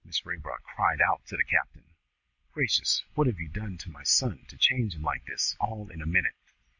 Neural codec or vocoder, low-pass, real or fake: none; 7.2 kHz; real